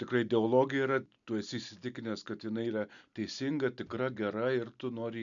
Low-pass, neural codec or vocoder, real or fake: 7.2 kHz; none; real